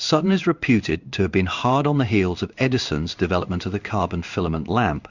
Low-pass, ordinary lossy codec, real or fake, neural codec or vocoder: 7.2 kHz; Opus, 64 kbps; fake; codec, 16 kHz in and 24 kHz out, 1 kbps, XY-Tokenizer